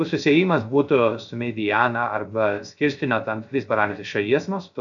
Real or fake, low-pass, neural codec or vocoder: fake; 7.2 kHz; codec, 16 kHz, 0.3 kbps, FocalCodec